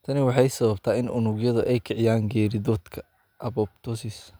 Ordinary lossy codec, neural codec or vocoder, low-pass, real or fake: none; none; none; real